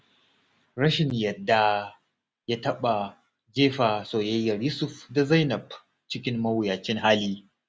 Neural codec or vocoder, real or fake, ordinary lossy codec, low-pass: none; real; none; none